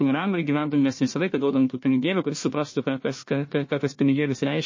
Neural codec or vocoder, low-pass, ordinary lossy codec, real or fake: codec, 16 kHz, 1 kbps, FunCodec, trained on Chinese and English, 50 frames a second; 7.2 kHz; MP3, 32 kbps; fake